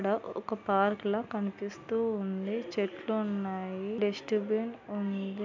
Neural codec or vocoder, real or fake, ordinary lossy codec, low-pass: none; real; MP3, 64 kbps; 7.2 kHz